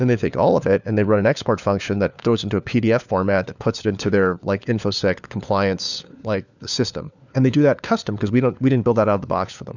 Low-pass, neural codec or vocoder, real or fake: 7.2 kHz; codec, 16 kHz, 4 kbps, FunCodec, trained on LibriTTS, 50 frames a second; fake